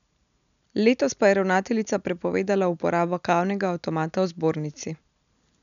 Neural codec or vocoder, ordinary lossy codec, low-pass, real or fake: none; none; 7.2 kHz; real